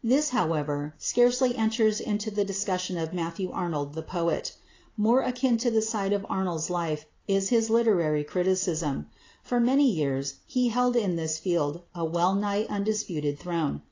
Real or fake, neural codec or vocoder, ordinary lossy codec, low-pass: real; none; AAC, 32 kbps; 7.2 kHz